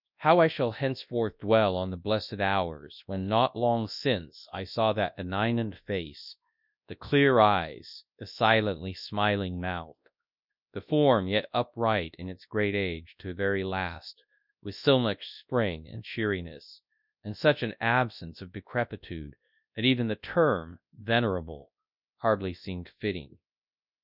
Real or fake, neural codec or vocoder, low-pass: fake; codec, 24 kHz, 0.9 kbps, WavTokenizer, large speech release; 5.4 kHz